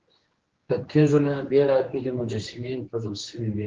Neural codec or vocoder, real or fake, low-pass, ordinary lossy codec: codec, 16 kHz, 2 kbps, X-Codec, HuBERT features, trained on general audio; fake; 7.2 kHz; Opus, 16 kbps